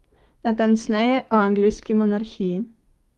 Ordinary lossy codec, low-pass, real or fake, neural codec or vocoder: Opus, 32 kbps; 14.4 kHz; fake; codec, 32 kHz, 1.9 kbps, SNAC